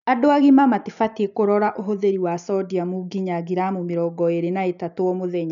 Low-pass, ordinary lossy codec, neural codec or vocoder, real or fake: 7.2 kHz; none; none; real